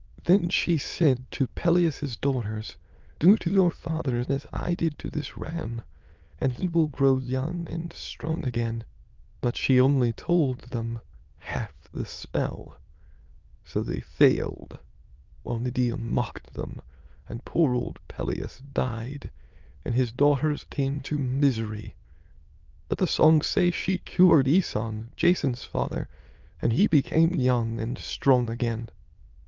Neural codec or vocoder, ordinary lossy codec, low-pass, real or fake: autoencoder, 22.05 kHz, a latent of 192 numbers a frame, VITS, trained on many speakers; Opus, 24 kbps; 7.2 kHz; fake